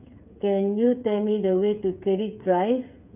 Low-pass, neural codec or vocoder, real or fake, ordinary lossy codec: 3.6 kHz; codec, 16 kHz, 8 kbps, FreqCodec, smaller model; fake; none